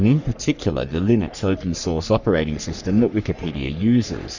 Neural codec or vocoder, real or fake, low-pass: codec, 44.1 kHz, 3.4 kbps, Pupu-Codec; fake; 7.2 kHz